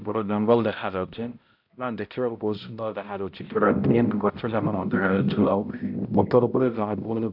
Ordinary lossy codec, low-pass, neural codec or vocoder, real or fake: AAC, 48 kbps; 5.4 kHz; codec, 16 kHz, 0.5 kbps, X-Codec, HuBERT features, trained on balanced general audio; fake